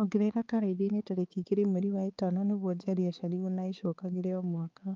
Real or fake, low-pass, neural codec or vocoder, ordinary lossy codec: fake; 7.2 kHz; codec, 16 kHz, 4 kbps, X-Codec, HuBERT features, trained on balanced general audio; Opus, 32 kbps